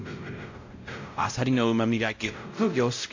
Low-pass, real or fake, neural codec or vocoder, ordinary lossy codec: 7.2 kHz; fake; codec, 16 kHz, 0.5 kbps, X-Codec, WavLM features, trained on Multilingual LibriSpeech; AAC, 48 kbps